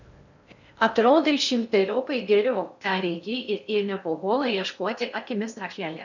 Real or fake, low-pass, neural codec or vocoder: fake; 7.2 kHz; codec, 16 kHz in and 24 kHz out, 0.6 kbps, FocalCodec, streaming, 4096 codes